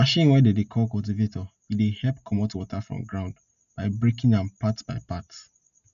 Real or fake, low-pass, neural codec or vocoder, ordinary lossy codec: real; 7.2 kHz; none; none